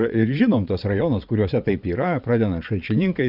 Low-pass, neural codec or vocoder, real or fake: 5.4 kHz; vocoder, 22.05 kHz, 80 mel bands, WaveNeXt; fake